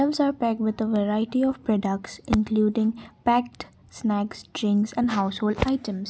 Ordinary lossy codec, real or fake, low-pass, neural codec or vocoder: none; real; none; none